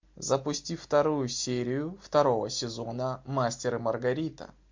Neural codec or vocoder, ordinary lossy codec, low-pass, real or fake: none; MP3, 48 kbps; 7.2 kHz; real